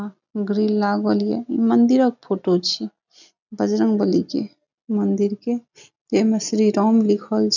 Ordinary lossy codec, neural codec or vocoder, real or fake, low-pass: none; none; real; 7.2 kHz